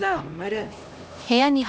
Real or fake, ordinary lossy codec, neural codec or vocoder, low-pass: fake; none; codec, 16 kHz, 1 kbps, X-Codec, HuBERT features, trained on LibriSpeech; none